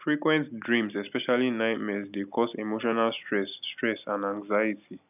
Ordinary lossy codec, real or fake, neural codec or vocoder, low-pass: none; real; none; 3.6 kHz